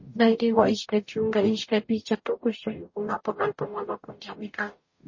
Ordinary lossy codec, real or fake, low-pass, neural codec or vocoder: MP3, 32 kbps; fake; 7.2 kHz; codec, 44.1 kHz, 0.9 kbps, DAC